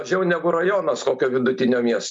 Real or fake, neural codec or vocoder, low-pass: real; none; 10.8 kHz